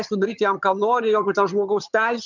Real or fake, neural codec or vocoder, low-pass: fake; vocoder, 22.05 kHz, 80 mel bands, HiFi-GAN; 7.2 kHz